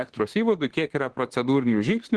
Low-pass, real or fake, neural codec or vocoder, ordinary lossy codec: 10.8 kHz; fake; autoencoder, 48 kHz, 32 numbers a frame, DAC-VAE, trained on Japanese speech; Opus, 16 kbps